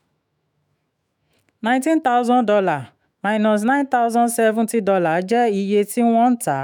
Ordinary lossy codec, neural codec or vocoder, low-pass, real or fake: none; autoencoder, 48 kHz, 128 numbers a frame, DAC-VAE, trained on Japanese speech; 19.8 kHz; fake